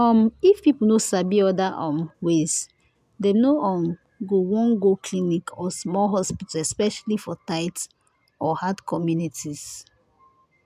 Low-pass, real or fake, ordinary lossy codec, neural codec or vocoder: 14.4 kHz; real; AAC, 96 kbps; none